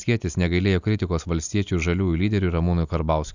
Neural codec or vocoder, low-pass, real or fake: none; 7.2 kHz; real